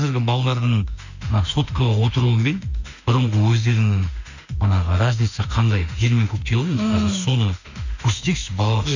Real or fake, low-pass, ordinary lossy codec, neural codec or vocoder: fake; 7.2 kHz; none; autoencoder, 48 kHz, 32 numbers a frame, DAC-VAE, trained on Japanese speech